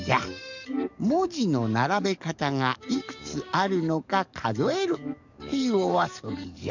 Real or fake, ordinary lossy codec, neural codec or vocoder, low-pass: fake; none; codec, 44.1 kHz, 7.8 kbps, DAC; 7.2 kHz